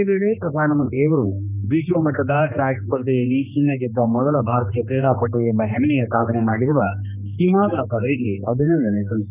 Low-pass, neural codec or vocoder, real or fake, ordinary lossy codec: 3.6 kHz; codec, 16 kHz, 2 kbps, X-Codec, HuBERT features, trained on general audio; fake; none